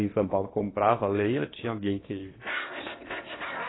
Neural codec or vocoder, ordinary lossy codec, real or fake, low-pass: codec, 16 kHz in and 24 kHz out, 0.8 kbps, FocalCodec, streaming, 65536 codes; AAC, 16 kbps; fake; 7.2 kHz